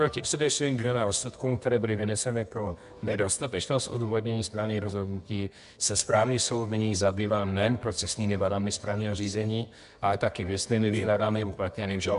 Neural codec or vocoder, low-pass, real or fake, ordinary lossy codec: codec, 24 kHz, 0.9 kbps, WavTokenizer, medium music audio release; 10.8 kHz; fake; AAC, 96 kbps